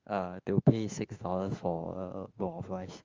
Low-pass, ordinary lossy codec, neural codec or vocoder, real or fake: 7.2 kHz; Opus, 32 kbps; autoencoder, 48 kHz, 32 numbers a frame, DAC-VAE, trained on Japanese speech; fake